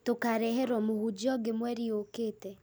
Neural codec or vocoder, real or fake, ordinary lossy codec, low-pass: none; real; none; none